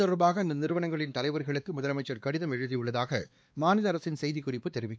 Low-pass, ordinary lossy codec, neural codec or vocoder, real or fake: none; none; codec, 16 kHz, 2 kbps, X-Codec, WavLM features, trained on Multilingual LibriSpeech; fake